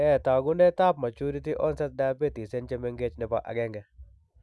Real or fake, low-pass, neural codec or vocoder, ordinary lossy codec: real; none; none; none